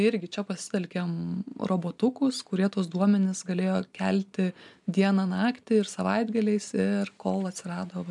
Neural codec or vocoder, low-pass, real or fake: none; 10.8 kHz; real